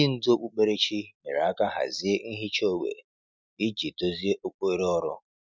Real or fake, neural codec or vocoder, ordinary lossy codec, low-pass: real; none; none; none